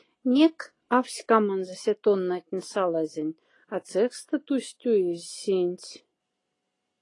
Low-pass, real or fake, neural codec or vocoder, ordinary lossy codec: 10.8 kHz; real; none; AAC, 32 kbps